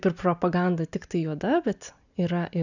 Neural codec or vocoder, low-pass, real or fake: none; 7.2 kHz; real